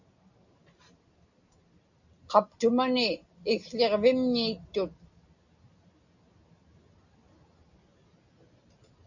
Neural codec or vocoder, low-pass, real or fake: none; 7.2 kHz; real